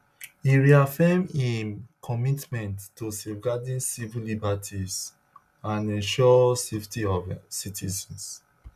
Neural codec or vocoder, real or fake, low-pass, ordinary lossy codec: none; real; 14.4 kHz; none